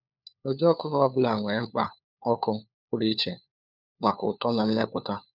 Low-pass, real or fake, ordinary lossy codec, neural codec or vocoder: 5.4 kHz; fake; none; codec, 16 kHz, 4 kbps, FunCodec, trained on LibriTTS, 50 frames a second